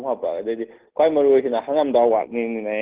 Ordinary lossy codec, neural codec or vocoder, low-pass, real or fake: Opus, 16 kbps; none; 3.6 kHz; real